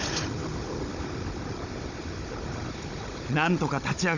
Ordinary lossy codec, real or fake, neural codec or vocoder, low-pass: none; fake; codec, 16 kHz, 16 kbps, FunCodec, trained on Chinese and English, 50 frames a second; 7.2 kHz